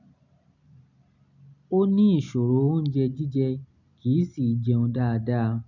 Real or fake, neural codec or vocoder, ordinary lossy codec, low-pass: real; none; none; 7.2 kHz